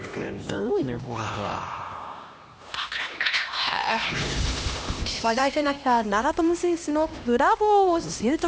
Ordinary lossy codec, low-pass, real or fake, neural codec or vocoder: none; none; fake; codec, 16 kHz, 1 kbps, X-Codec, HuBERT features, trained on LibriSpeech